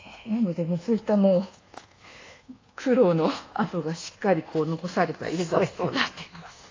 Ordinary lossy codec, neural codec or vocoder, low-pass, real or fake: AAC, 32 kbps; codec, 24 kHz, 1.2 kbps, DualCodec; 7.2 kHz; fake